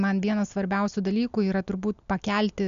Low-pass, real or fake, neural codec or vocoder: 7.2 kHz; real; none